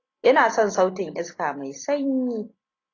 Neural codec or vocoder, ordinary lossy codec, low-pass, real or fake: none; AAC, 32 kbps; 7.2 kHz; real